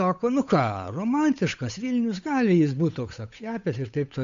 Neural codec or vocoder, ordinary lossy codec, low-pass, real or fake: codec, 16 kHz, 8 kbps, FunCodec, trained on Chinese and English, 25 frames a second; MP3, 64 kbps; 7.2 kHz; fake